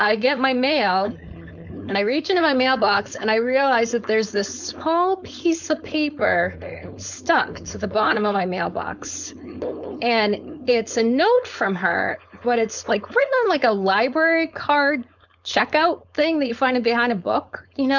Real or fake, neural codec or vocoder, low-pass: fake; codec, 16 kHz, 4.8 kbps, FACodec; 7.2 kHz